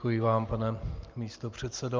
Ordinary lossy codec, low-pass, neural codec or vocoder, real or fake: Opus, 24 kbps; 7.2 kHz; none; real